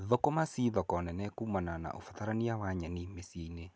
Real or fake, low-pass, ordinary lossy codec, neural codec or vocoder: real; none; none; none